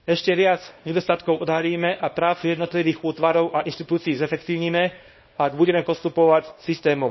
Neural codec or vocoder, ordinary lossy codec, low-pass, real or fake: codec, 24 kHz, 0.9 kbps, WavTokenizer, small release; MP3, 24 kbps; 7.2 kHz; fake